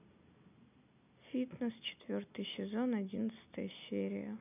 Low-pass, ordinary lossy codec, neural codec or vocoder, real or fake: 3.6 kHz; none; none; real